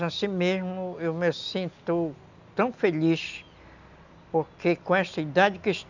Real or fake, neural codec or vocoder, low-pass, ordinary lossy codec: real; none; 7.2 kHz; none